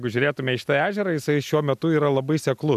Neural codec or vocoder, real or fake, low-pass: none; real; 14.4 kHz